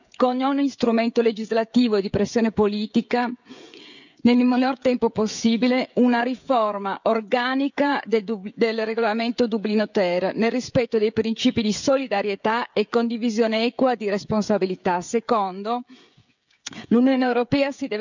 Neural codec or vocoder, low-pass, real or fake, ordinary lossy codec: codec, 16 kHz, 16 kbps, FreqCodec, smaller model; 7.2 kHz; fake; none